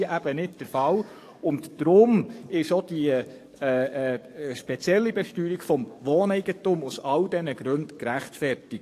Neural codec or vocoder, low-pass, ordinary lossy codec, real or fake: codec, 44.1 kHz, 7.8 kbps, DAC; 14.4 kHz; AAC, 64 kbps; fake